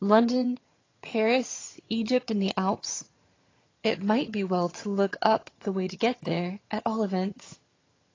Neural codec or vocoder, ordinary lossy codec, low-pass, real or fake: vocoder, 22.05 kHz, 80 mel bands, HiFi-GAN; AAC, 32 kbps; 7.2 kHz; fake